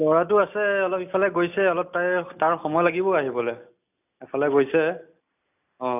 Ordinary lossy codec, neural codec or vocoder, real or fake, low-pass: none; none; real; 3.6 kHz